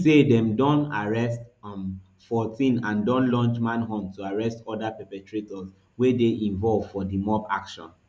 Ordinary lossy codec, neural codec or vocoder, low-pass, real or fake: none; none; none; real